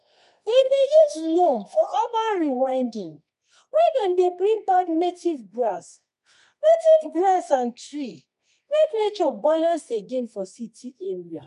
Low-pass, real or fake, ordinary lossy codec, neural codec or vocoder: 10.8 kHz; fake; MP3, 96 kbps; codec, 24 kHz, 0.9 kbps, WavTokenizer, medium music audio release